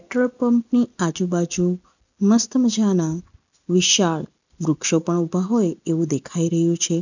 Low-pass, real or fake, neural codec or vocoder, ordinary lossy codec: 7.2 kHz; real; none; none